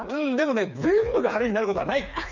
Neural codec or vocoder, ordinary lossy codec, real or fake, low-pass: codec, 16 kHz, 4 kbps, FreqCodec, smaller model; none; fake; 7.2 kHz